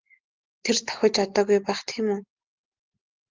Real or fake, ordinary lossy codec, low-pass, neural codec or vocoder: real; Opus, 16 kbps; 7.2 kHz; none